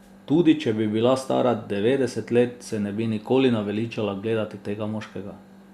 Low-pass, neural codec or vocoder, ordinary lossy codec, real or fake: 14.4 kHz; none; Opus, 64 kbps; real